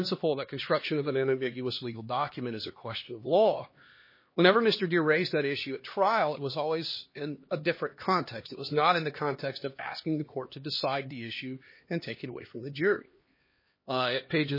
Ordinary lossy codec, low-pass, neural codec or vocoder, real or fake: MP3, 24 kbps; 5.4 kHz; codec, 16 kHz, 2 kbps, X-Codec, HuBERT features, trained on LibriSpeech; fake